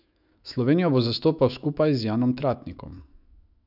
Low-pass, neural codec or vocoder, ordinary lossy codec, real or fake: 5.4 kHz; autoencoder, 48 kHz, 128 numbers a frame, DAC-VAE, trained on Japanese speech; none; fake